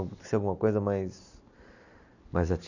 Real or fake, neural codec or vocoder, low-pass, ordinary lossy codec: real; none; 7.2 kHz; none